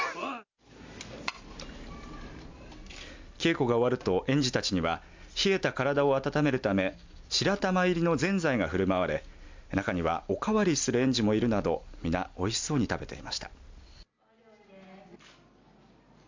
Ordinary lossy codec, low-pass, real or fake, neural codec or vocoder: MP3, 64 kbps; 7.2 kHz; real; none